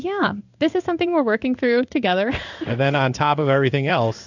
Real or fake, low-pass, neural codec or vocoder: fake; 7.2 kHz; codec, 16 kHz in and 24 kHz out, 1 kbps, XY-Tokenizer